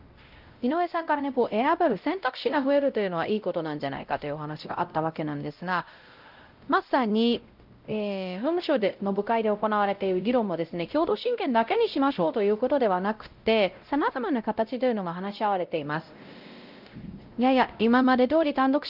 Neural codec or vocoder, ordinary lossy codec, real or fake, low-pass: codec, 16 kHz, 0.5 kbps, X-Codec, WavLM features, trained on Multilingual LibriSpeech; Opus, 24 kbps; fake; 5.4 kHz